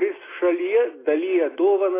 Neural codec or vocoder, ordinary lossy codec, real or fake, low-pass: none; AAC, 16 kbps; real; 3.6 kHz